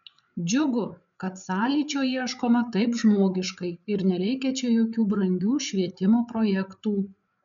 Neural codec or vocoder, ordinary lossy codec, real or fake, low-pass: codec, 16 kHz, 8 kbps, FreqCodec, larger model; MP3, 96 kbps; fake; 7.2 kHz